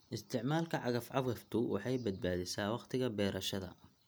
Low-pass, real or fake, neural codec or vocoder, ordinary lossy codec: none; real; none; none